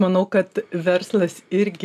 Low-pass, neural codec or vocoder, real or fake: 14.4 kHz; none; real